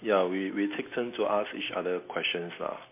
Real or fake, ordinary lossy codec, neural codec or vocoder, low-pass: real; MP3, 24 kbps; none; 3.6 kHz